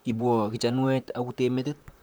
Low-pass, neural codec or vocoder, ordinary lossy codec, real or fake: none; vocoder, 44.1 kHz, 128 mel bands, Pupu-Vocoder; none; fake